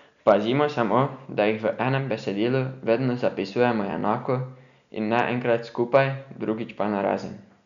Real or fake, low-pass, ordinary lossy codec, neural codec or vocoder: real; 7.2 kHz; none; none